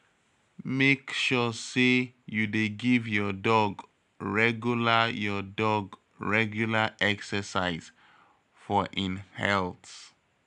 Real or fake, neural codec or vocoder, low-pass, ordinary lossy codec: real; none; 10.8 kHz; none